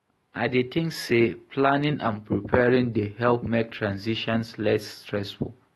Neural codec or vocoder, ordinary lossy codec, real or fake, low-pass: autoencoder, 48 kHz, 128 numbers a frame, DAC-VAE, trained on Japanese speech; AAC, 32 kbps; fake; 19.8 kHz